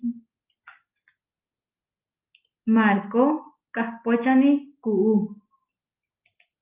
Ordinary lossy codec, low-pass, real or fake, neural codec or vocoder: Opus, 24 kbps; 3.6 kHz; real; none